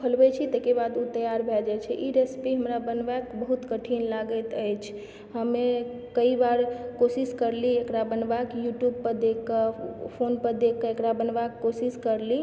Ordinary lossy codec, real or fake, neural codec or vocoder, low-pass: none; real; none; none